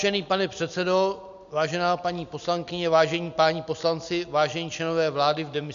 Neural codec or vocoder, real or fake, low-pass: none; real; 7.2 kHz